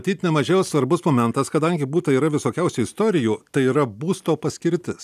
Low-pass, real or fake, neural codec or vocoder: 14.4 kHz; real; none